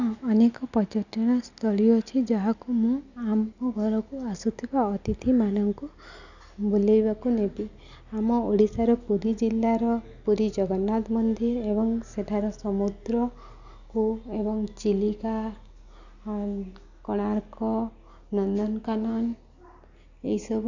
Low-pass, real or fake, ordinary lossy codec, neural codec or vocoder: 7.2 kHz; real; none; none